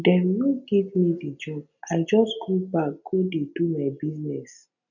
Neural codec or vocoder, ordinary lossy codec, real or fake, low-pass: none; none; real; 7.2 kHz